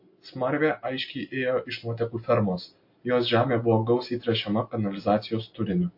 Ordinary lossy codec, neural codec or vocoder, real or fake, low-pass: MP3, 32 kbps; none; real; 5.4 kHz